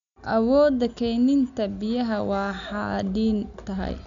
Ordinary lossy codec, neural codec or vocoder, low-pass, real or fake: none; none; 7.2 kHz; real